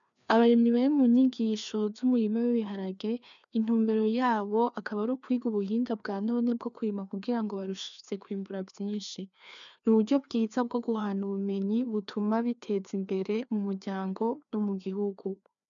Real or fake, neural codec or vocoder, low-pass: fake; codec, 16 kHz, 2 kbps, FreqCodec, larger model; 7.2 kHz